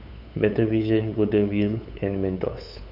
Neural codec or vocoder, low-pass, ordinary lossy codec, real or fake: codec, 16 kHz, 8 kbps, FunCodec, trained on LibriTTS, 25 frames a second; 5.4 kHz; none; fake